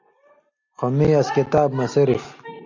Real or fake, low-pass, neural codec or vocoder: real; 7.2 kHz; none